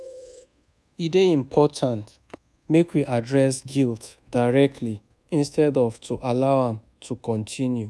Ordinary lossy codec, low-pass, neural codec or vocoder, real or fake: none; none; codec, 24 kHz, 1.2 kbps, DualCodec; fake